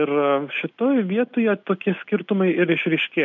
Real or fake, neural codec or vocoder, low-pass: real; none; 7.2 kHz